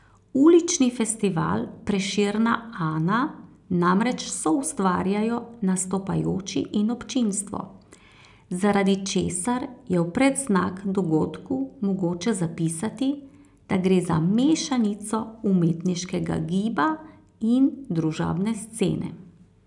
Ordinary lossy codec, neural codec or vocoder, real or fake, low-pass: none; none; real; 10.8 kHz